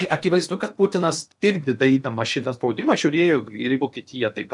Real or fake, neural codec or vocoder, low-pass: fake; codec, 16 kHz in and 24 kHz out, 0.8 kbps, FocalCodec, streaming, 65536 codes; 10.8 kHz